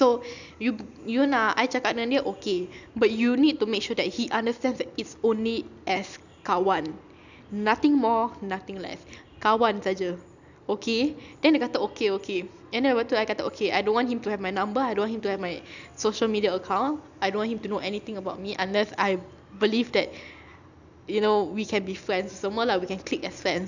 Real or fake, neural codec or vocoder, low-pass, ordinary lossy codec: real; none; 7.2 kHz; none